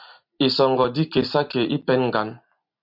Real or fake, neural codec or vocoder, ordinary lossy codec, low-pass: fake; vocoder, 44.1 kHz, 128 mel bands every 256 samples, BigVGAN v2; MP3, 48 kbps; 5.4 kHz